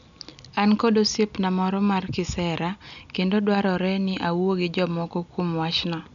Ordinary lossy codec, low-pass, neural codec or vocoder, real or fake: none; 7.2 kHz; none; real